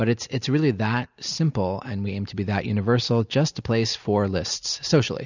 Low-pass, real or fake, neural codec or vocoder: 7.2 kHz; real; none